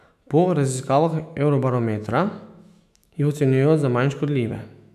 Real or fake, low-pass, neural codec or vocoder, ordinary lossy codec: fake; 14.4 kHz; autoencoder, 48 kHz, 128 numbers a frame, DAC-VAE, trained on Japanese speech; none